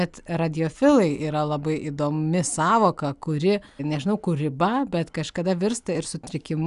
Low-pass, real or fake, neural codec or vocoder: 10.8 kHz; real; none